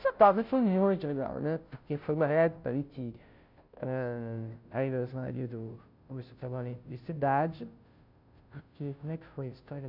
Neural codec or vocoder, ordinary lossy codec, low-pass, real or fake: codec, 16 kHz, 0.5 kbps, FunCodec, trained on Chinese and English, 25 frames a second; none; 5.4 kHz; fake